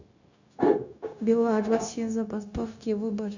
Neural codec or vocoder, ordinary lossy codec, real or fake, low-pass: codec, 16 kHz, 0.9 kbps, LongCat-Audio-Codec; none; fake; 7.2 kHz